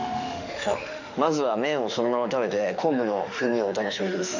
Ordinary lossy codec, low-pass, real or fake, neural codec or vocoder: none; 7.2 kHz; fake; autoencoder, 48 kHz, 32 numbers a frame, DAC-VAE, trained on Japanese speech